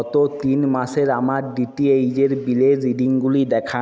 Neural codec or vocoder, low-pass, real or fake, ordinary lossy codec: none; none; real; none